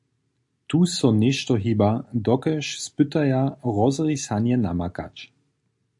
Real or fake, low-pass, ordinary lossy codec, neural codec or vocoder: real; 10.8 kHz; AAC, 64 kbps; none